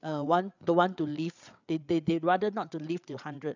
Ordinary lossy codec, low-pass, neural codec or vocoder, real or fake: none; 7.2 kHz; codec, 16 kHz, 8 kbps, FreqCodec, larger model; fake